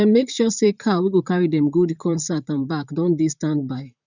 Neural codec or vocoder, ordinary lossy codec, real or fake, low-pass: vocoder, 44.1 kHz, 128 mel bands, Pupu-Vocoder; none; fake; 7.2 kHz